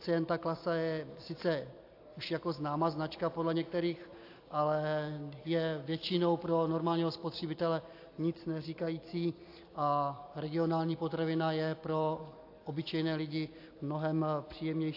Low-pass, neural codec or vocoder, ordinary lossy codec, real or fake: 5.4 kHz; none; AAC, 32 kbps; real